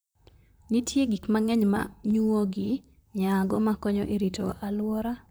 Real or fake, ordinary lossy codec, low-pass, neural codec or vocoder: fake; none; none; vocoder, 44.1 kHz, 128 mel bands, Pupu-Vocoder